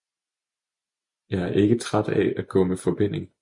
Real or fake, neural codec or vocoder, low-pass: real; none; 10.8 kHz